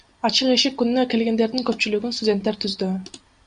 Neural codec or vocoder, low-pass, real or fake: none; 9.9 kHz; real